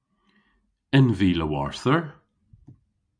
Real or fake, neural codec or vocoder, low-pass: real; none; 9.9 kHz